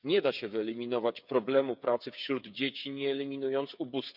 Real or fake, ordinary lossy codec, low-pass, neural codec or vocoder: fake; none; 5.4 kHz; codec, 16 kHz, 8 kbps, FreqCodec, smaller model